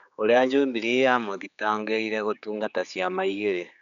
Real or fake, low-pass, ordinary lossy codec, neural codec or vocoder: fake; 7.2 kHz; none; codec, 16 kHz, 4 kbps, X-Codec, HuBERT features, trained on general audio